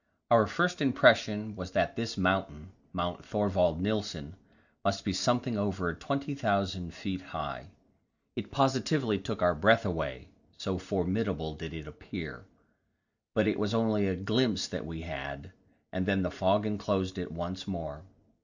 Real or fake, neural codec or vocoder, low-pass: real; none; 7.2 kHz